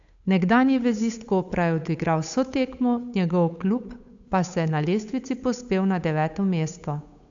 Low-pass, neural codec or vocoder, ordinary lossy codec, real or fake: 7.2 kHz; codec, 16 kHz, 8 kbps, FunCodec, trained on Chinese and English, 25 frames a second; AAC, 64 kbps; fake